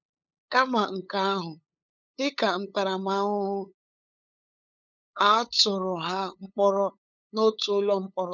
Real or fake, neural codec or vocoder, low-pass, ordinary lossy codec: fake; codec, 16 kHz, 8 kbps, FunCodec, trained on LibriTTS, 25 frames a second; 7.2 kHz; none